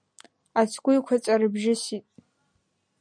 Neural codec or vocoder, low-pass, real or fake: none; 9.9 kHz; real